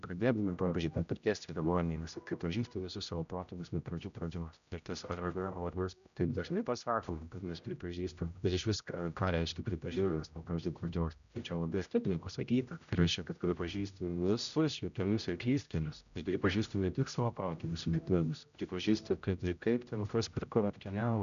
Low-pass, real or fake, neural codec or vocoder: 7.2 kHz; fake; codec, 16 kHz, 0.5 kbps, X-Codec, HuBERT features, trained on general audio